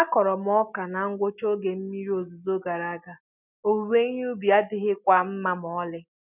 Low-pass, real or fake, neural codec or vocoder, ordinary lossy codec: 3.6 kHz; real; none; none